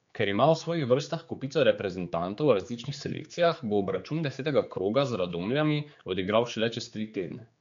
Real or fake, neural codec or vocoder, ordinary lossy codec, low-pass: fake; codec, 16 kHz, 4 kbps, X-Codec, HuBERT features, trained on general audio; MP3, 64 kbps; 7.2 kHz